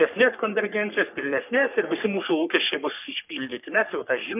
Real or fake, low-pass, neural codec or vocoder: fake; 3.6 kHz; codec, 44.1 kHz, 3.4 kbps, Pupu-Codec